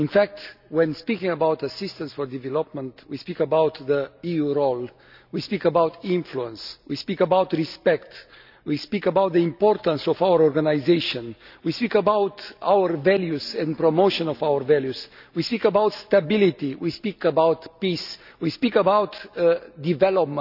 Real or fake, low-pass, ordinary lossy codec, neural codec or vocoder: real; 5.4 kHz; none; none